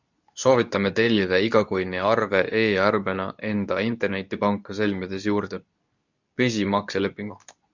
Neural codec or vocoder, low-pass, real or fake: codec, 24 kHz, 0.9 kbps, WavTokenizer, medium speech release version 2; 7.2 kHz; fake